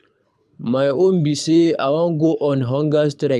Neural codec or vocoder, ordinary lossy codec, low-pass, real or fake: codec, 24 kHz, 6 kbps, HILCodec; none; none; fake